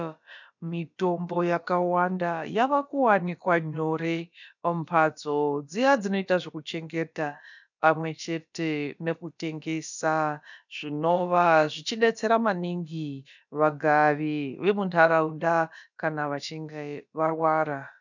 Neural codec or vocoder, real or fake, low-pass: codec, 16 kHz, about 1 kbps, DyCAST, with the encoder's durations; fake; 7.2 kHz